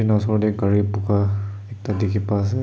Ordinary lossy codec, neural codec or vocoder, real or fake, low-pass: none; none; real; none